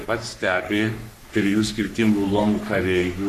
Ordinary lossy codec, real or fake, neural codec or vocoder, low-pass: MP3, 96 kbps; fake; codec, 44.1 kHz, 3.4 kbps, Pupu-Codec; 14.4 kHz